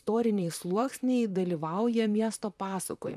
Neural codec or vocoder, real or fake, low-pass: vocoder, 44.1 kHz, 128 mel bands, Pupu-Vocoder; fake; 14.4 kHz